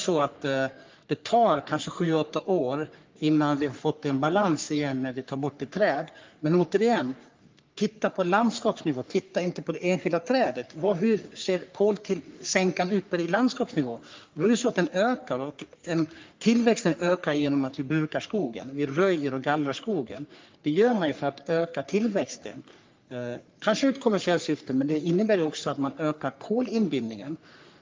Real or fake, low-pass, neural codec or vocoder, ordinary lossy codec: fake; 7.2 kHz; codec, 44.1 kHz, 3.4 kbps, Pupu-Codec; Opus, 24 kbps